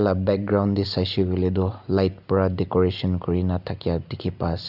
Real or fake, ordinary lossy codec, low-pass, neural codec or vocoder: real; none; 5.4 kHz; none